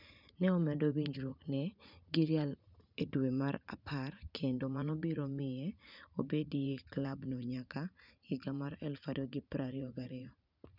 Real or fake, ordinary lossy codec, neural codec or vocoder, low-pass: fake; none; vocoder, 44.1 kHz, 80 mel bands, Vocos; 5.4 kHz